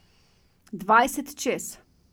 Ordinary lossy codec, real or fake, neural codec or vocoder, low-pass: none; real; none; none